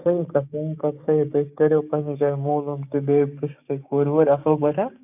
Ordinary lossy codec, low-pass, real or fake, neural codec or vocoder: none; 3.6 kHz; fake; codec, 16 kHz, 6 kbps, DAC